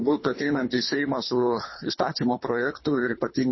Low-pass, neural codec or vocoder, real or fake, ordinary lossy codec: 7.2 kHz; codec, 24 kHz, 3 kbps, HILCodec; fake; MP3, 24 kbps